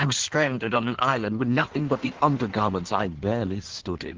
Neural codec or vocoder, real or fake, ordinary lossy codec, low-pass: codec, 16 kHz in and 24 kHz out, 1.1 kbps, FireRedTTS-2 codec; fake; Opus, 16 kbps; 7.2 kHz